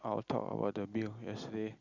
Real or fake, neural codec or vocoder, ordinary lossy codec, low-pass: real; none; Opus, 64 kbps; 7.2 kHz